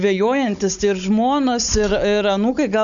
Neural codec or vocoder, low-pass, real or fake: codec, 16 kHz, 16 kbps, FunCodec, trained on Chinese and English, 50 frames a second; 7.2 kHz; fake